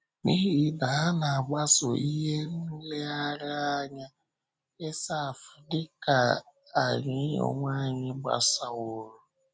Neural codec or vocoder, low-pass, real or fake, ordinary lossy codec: none; none; real; none